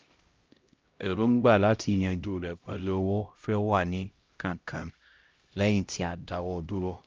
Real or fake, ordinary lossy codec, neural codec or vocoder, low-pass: fake; Opus, 24 kbps; codec, 16 kHz, 0.5 kbps, X-Codec, HuBERT features, trained on LibriSpeech; 7.2 kHz